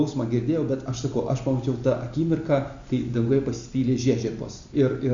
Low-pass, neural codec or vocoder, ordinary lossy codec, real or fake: 7.2 kHz; none; Opus, 64 kbps; real